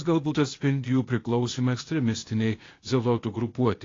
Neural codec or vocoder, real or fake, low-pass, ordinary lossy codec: codec, 16 kHz, 0.8 kbps, ZipCodec; fake; 7.2 kHz; AAC, 32 kbps